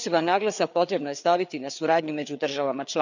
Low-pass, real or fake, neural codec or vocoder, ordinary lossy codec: 7.2 kHz; fake; codec, 16 kHz, 4 kbps, FreqCodec, larger model; none